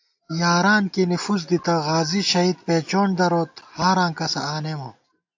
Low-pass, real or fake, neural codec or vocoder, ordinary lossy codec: 7.2 kHz; real; none; AAC, 48 kbps